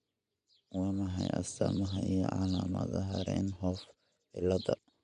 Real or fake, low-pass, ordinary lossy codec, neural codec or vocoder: fake; 10.8 kHz; none; vocoder, 24 kHz, 100 mel bands, Vocos